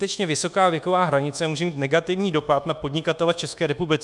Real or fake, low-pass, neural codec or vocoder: fake; 10.8 kHz; codec, 24 kHz, 1.2 kbps, DualCodec